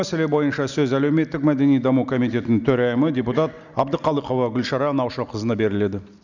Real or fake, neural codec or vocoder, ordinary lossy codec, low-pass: real; none; none; 7.2 kHz